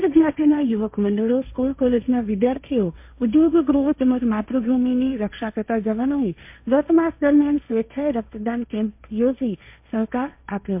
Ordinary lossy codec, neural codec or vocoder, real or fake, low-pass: MP3, 32 kbps; codec, 16 kHz, 1.1 kbps, Voila-Tokenizer; fake; 3.6 kHz